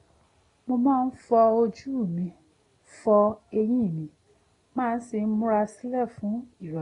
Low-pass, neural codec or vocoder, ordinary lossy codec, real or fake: 10.8 kHz; none; AAC, 32 kbps; real